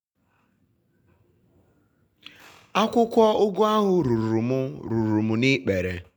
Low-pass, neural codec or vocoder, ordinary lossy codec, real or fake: none; none; none; real